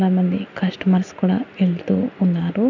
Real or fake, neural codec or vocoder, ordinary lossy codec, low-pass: real; none; none; 7.2 kHz